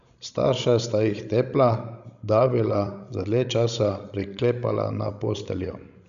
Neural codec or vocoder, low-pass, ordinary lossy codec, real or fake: codec, 16 kHz, 16 kbps, FreqCodec, larger model; 7.2 kHz; none; fake